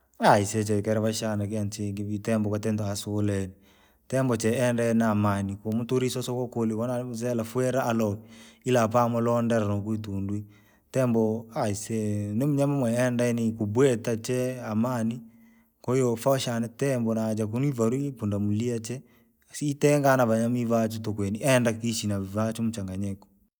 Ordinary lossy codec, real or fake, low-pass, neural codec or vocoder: none; real; none; none